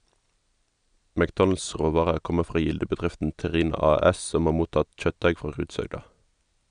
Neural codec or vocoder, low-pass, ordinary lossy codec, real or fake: none; 9.9 kHz; none; real